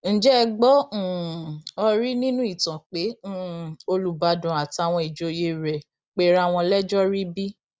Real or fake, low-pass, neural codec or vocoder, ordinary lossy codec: real; none; none; none